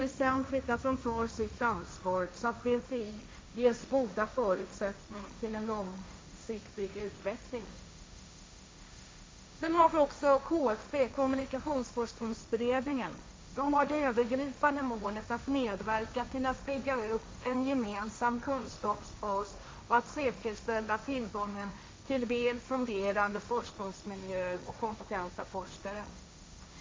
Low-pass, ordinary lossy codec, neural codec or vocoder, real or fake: none; none; codec, 16 kHz, 1.1 kbps, Voila-Tokenizer; fake